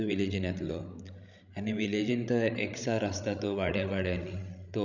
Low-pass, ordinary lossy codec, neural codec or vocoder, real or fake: 7.2 kHz; none; codec, 16 kHz, 16 kbps, FreqCodec, larger model; fake